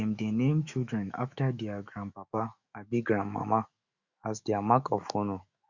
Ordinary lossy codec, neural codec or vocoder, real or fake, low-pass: Opus, 64 kbps; autoencoder, 48 kHz, 128 numbers a frame, DAC-VAE, trained on Japanese speech; fake; 7.2 kHz